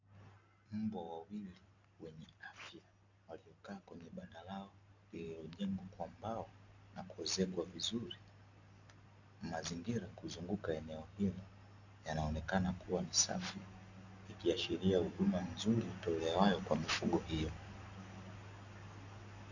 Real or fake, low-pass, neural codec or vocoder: real; 7.2 kHz; none